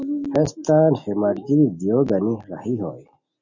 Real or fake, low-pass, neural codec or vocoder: real; 7.2 kHz; none